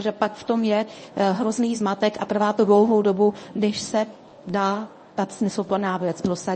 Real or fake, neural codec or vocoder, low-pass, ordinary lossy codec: fake; codec, 24 kHz, 0.9 kbps, WavTokenizer, medium speech release version 1; 10.8 kHz; MP3, 32 kbps